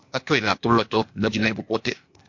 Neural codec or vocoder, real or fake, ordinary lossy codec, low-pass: codec, 16 kHz, 0.8 kbps, ZipCodec; fake; MP3, 48 kbps; 7.2 kHz